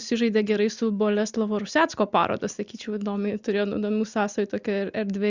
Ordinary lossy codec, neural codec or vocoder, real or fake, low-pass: Opus, 64 kbps; none; real; 7.2 kHz